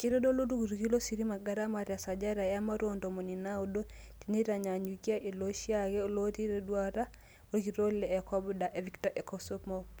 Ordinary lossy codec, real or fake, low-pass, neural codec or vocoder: none; real; none; none